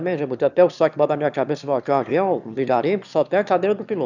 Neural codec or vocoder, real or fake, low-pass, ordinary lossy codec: autoencoder, 22.05 kHz, a latent of 192 numbers a frame, VITS, trained on one speaker; fake; 7.2 kHz; none